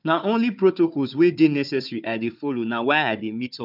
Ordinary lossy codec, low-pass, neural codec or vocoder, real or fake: none; 5.4 kHz; codec, 16 kHz, 4 kbps, FunCodec, trained on Chinese and English, 50 frames a second; fake